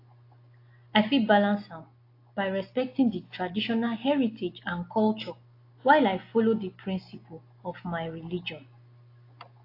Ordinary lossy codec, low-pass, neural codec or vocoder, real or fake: AAC, 32 kbps; 5.4 kHz; none; real